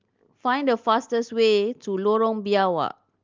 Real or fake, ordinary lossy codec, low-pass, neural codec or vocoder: real; Opus, 32 kbps; 7.2 kHz; none